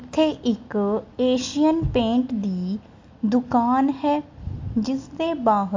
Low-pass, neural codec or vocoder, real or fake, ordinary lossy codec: 7.2 kHz; none; real; MP3, 48 kbps